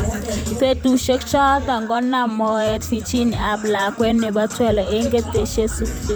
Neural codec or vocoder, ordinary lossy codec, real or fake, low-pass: vocoder, 44.1 kHz, 128 mel bands, Pupu-Vocoder; none; fake; none